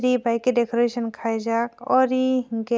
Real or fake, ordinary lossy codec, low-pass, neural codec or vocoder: real; none; none; none